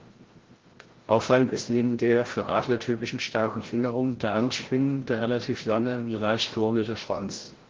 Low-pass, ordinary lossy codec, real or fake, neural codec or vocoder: 7.2 kHz; Opus, 16 kbps; fake; codec, 16 kHz, 0.5 kbps, FreqCodec, larger model